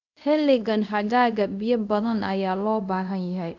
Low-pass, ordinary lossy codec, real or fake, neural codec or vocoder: 7.2 kHz; none; fake; codec, 24 kHz, 0.5 kbps, DualCodec